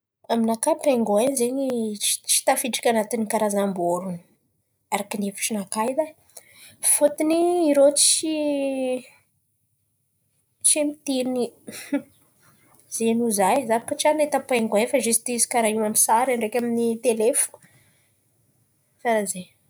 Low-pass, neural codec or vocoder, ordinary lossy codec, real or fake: none; none; none; real